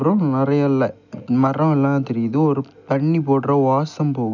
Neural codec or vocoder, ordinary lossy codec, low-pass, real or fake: none; none; 7.2 kHz; real